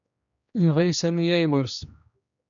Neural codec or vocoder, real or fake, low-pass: codec, 16 kHz, 1 kbps, X-Codec, HuBERT features, trained on general audio; fake; 7.2 kHz